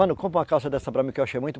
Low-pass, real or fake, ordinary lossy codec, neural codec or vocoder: none; real; none; none